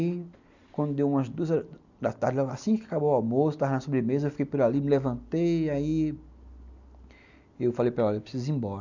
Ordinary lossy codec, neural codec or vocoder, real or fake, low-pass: none; none; real; 7.2 kHz